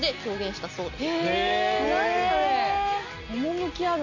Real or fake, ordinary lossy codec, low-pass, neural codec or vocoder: real; none; 7.2 kHz; none